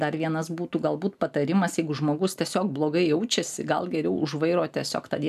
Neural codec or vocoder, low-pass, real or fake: none; 14.4 kHz; real